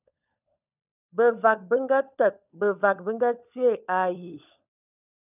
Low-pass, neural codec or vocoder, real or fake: 3.6 kHz; codec, 16 kHz, 16 kbps, FunCodec, trained on LibriTTS, 50 frames a second; fake